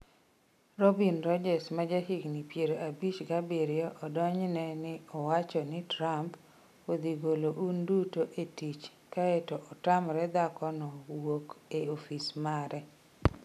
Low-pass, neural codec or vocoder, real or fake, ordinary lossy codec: 14.4 kHz; none; real; none